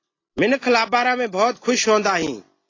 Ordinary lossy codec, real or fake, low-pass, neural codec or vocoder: AAC, 32 kbps; real; 7.2 kHz; none